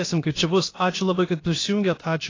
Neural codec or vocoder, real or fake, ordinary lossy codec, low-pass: codec, 16 kHz, about 1 kbps, DyCAST, with the encoder's durations; fake; AAC, 32 kbps; 7.2 kHz